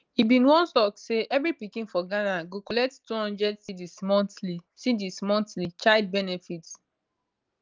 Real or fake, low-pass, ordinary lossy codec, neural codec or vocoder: real; 7.2 kHz; Opus, 32 kbps; none